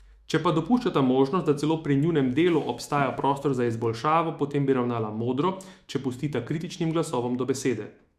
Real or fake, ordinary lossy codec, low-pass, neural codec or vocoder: fake; Opus, 64 kbps; 14.4 kHz; autoencoder, 48 kHz, 128 numbers a frame, DAC-VAE, trained on Japanese speech